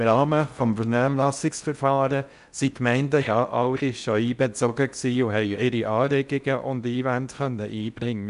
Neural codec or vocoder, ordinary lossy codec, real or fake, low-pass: codec, 16 kHz in and 24 kHz out, 0.6 kbps, FocalCodec, streaming, 4096 codes; none; fake; 10.8 kHz